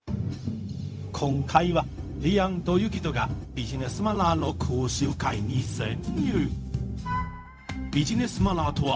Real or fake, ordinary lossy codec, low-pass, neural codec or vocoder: fake; none; none; codec, 16 kHz, 0.4 kbps, LongCat-Audio-Codec